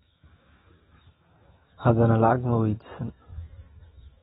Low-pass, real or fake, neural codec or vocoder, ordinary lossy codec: 19.8 kHz; fake; autoencoder, 48 kHz, 128 numbers a frame, DAC-VAE, trained on Japanese speech; AAC, 16 kbps